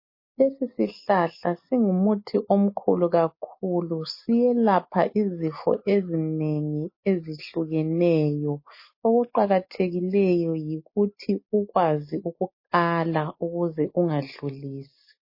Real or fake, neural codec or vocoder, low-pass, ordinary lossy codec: real; none; 5.4 kHz; MP3, 24 kbps